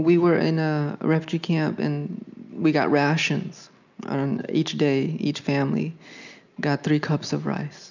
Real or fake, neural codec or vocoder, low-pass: real; none; 7.2 kHz